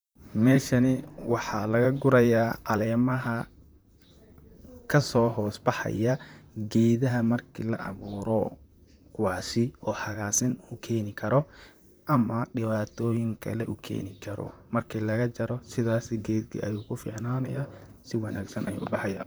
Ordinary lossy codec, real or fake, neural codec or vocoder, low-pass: none; fake; vocoder, 44.1 kHz, 128 mel bands, Pupu-Vocoder; none